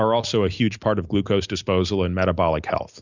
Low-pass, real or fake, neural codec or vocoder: 7.2 kHz; real; none